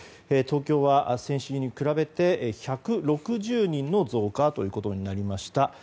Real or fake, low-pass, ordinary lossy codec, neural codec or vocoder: real; none; none; none